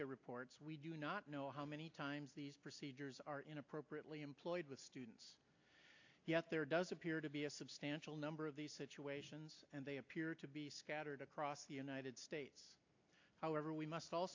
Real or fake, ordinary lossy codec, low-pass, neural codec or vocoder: real; AAC, 48 kbps; 7.2 kHz; none